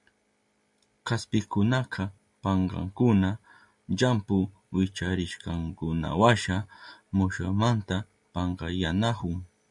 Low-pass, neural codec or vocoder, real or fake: 10.8 kHz; none; real